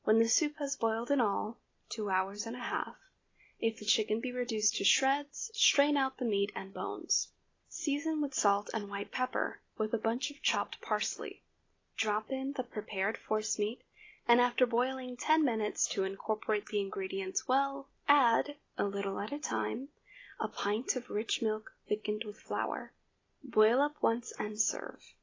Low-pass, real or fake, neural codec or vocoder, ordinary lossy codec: 7.2 kHz; real; none; AAC, 32 kbps